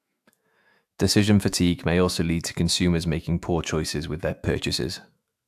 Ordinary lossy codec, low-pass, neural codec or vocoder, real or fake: AAC, 96 kbps; 14.4 kHz; autoencoder, 48 kHz, 128 numbers a frame, DAC-VAE, trained on Japanese speech; fake